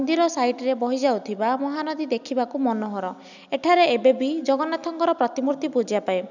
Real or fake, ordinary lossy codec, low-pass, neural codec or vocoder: real; none; 7.2 kHz; none